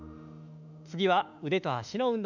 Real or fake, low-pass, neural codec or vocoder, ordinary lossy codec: fake; 7.2 kHz; autoencoder, 48 kHz, 32 numbers a frame, DAC-VAE, trained on Japanese speech; none